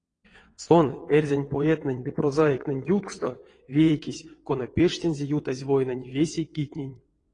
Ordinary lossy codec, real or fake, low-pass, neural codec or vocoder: AAC, 32 kbps; fake; 9.9 kHz; vocoder, 22.05 kHz, 80 mel bands, WaveNeXt